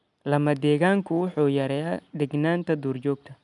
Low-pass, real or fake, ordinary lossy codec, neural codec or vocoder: 10.8 kHz; real; none; none